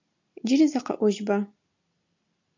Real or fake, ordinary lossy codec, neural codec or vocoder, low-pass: real; MP3, 48 kbps; none; 7.2 kHz